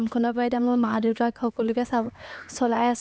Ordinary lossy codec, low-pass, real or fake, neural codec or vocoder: none; none; fake; codec, 16 kHz, 2 kbps, X-Codec, HuBERT features, trained on LibriSpeech